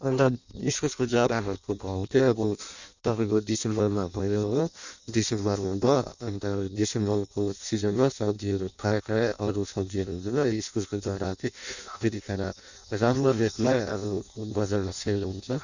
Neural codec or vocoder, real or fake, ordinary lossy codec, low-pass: codec, 16 kHz in and 24 kHz out, 0.6 kbps, FireRedTTS-2 codec; fake; none; 7.2 kHz